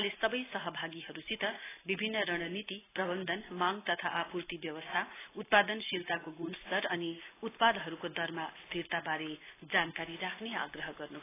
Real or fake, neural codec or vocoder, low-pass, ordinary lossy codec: real; none; 3.6 kHz; AAC, 16 kbps